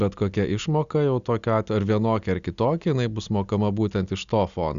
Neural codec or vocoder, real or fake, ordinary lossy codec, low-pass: none; real; Opus, 64 kbps; 7.2 kHz